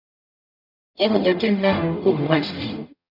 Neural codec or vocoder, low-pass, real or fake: codec, 44.1 kHz, 0.9 kbps, DAC; 5.4 kHz; fake